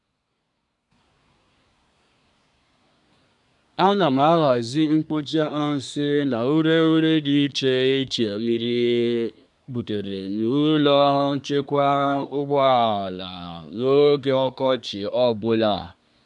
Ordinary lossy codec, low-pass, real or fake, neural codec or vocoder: none; 10.8 kHz; fake; codec, 24 kHz, 1 kbps, SNAC